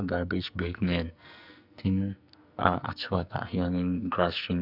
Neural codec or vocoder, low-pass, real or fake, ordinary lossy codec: codec, 44.1 kHz, 2.6 kbps, SNAC; 5.4 kHz; fake; none